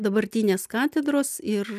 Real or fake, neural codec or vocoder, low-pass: real; none; 14.4 kHz